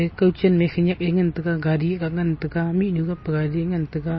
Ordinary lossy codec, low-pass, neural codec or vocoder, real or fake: MP3, 24 kbps; 7.2 kHz; none; real